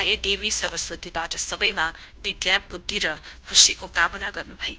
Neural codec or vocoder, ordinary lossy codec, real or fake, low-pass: codec, 16 kHz, 0.5 kbps, FunCodec, trained on Chinese and English, 25 frames a second; none; fake; none